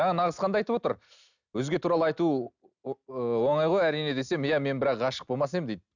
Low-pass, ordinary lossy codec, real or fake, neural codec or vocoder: 7.2 kHz; none; real; none